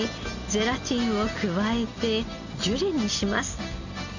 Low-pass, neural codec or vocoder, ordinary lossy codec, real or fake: 7.2 kHz; none; none; real